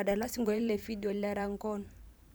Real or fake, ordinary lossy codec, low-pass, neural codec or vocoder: fake; none; none; vocoder, 44.1 kHz, 128 mel bands every 512 samples, BigVGAN v2